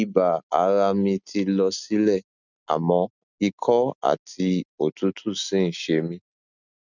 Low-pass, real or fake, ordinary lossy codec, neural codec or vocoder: 7.2 kHz; real; none; none